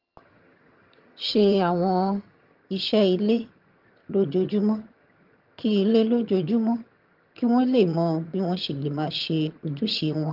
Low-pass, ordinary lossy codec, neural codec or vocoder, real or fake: 5.4 kHz; Opus, 24 kbps; vocoder, 22.05 kHz, 80 mel bands, HiFi-GAN; fake